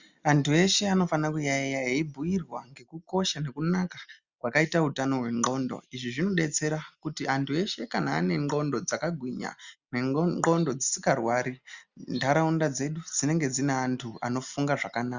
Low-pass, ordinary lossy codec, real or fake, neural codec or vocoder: 7.2 kHz; Opus, 64 kbps; real; none